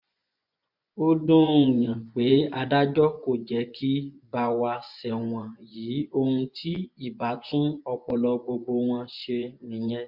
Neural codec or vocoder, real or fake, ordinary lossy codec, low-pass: vocoder, 24 kHz, 100 mel bands, Vocos; fake; MP3, 48 kbps; 5.4 kHz